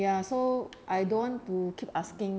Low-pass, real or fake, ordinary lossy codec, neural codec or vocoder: none; real; none; none